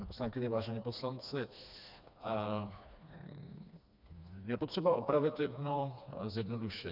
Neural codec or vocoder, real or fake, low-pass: codec, 16 kHz, 2 kbps, FreqCodec, smaller model; fake; 5.4 kHz